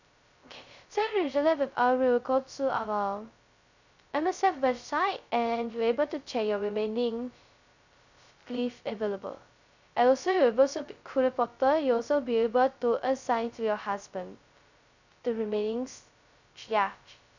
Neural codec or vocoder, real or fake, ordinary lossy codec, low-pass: codec, 16 kHz, 0.2 kbps, FocalCodec; fake; none; 7.2 kHz